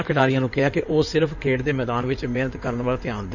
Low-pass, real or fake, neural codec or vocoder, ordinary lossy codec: 7.2 kHz; fake; codec, 16 kHz in and 24 kHz out, 2.2 kbps, FireRedTTS-2 codec; none